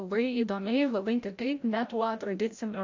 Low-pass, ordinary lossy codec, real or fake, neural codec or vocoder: 7.2 kHz; AAC, 48 kbps; fake; codec, 16 kHz, 0.5 kbps, FreqCodec, larger model